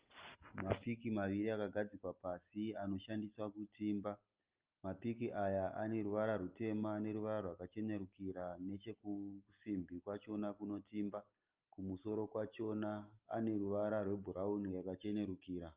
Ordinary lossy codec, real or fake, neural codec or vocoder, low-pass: Opus, 32 kbps; real; none; 3.6 kHz